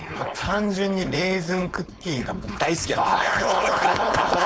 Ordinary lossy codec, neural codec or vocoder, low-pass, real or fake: none; codec, 16 kHz, 4.8 kbps, FACodec; none; fake